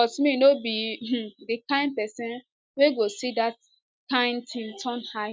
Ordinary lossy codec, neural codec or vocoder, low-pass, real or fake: none; none; none; real